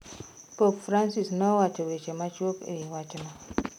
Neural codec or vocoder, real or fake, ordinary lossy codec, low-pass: none; real; none; 19.8 kHz